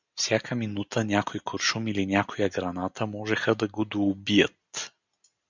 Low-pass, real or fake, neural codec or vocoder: 7.2 kHz; real; none